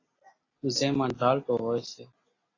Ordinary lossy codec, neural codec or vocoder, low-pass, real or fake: AAC, 32 kbps; none; 7.2 kHz; real